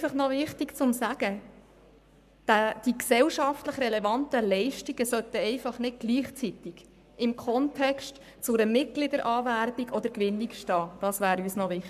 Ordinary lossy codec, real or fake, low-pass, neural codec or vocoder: none; fake; 14.4 kHz; codec, 44.1 kHz, 7.8 kbps, Pupu-Codec